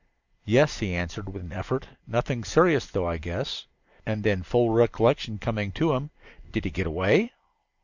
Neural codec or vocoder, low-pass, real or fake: none; 7.2 kHz; real